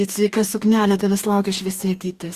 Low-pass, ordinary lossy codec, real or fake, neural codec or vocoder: 14.4 kHz; Opus, 64 kbps; fake; codec, 44.1 kHz, 2.6 kbps, DAC